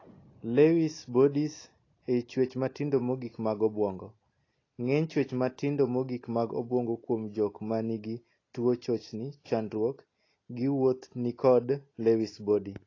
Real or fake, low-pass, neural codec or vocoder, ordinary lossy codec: real; 7.2 kHz; none; AAC, 32 kbps